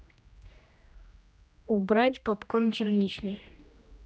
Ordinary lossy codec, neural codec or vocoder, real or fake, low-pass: none; codec, 16 kHz, 1 kbps, X-Codec, HuBERT features, trained on general audio; fake; none